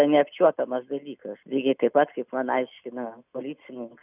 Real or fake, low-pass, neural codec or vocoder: real; 3.6 kHz; none